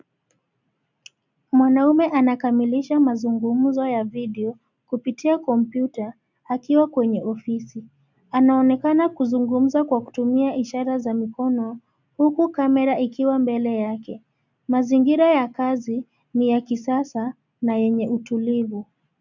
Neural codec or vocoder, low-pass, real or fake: none; 7.2 kHz; real